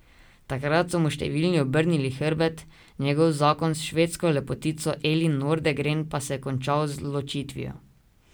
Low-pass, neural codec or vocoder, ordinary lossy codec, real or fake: none; none; none; real